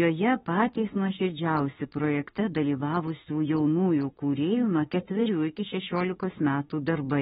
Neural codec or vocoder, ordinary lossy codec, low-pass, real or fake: autoencoder, 48 kHz, 32 numbers a frame, DAC-VAE, trained on Japanese speech; AAC, 16 kbps; 19.8 kHz; fake